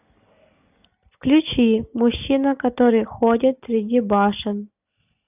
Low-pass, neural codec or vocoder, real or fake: 3.6 kHz; none; real